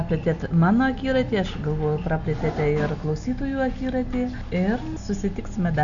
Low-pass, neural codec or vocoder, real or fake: 7.2 kHz; none; real